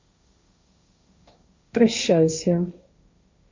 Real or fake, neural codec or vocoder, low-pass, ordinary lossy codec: fake; codec, 16 kHz, 1.1 kbps, Voila-Tokenizer; 7.2 kHz; MP3, 48 kbps